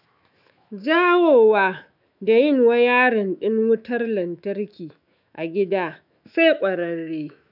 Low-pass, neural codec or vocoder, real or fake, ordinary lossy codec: 5.4 kHz; autoencoder, 48 kHz, 128 numbers a frame, DAC-VAE, trained on Japanese speech; fake; none